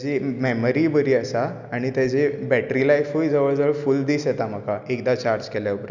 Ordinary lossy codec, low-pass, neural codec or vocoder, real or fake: none; 7.2 kHz; none; real